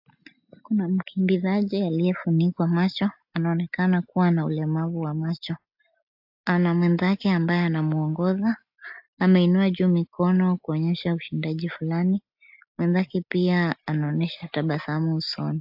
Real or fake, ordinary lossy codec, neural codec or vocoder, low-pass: real; AAC, 48 kbps; none; 5.4 kHz